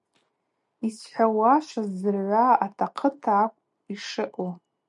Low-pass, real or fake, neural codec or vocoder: 10.8 kHz; real; none